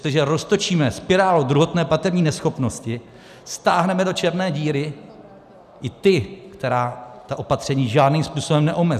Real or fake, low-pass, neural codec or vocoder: real; 14.4 kHz; none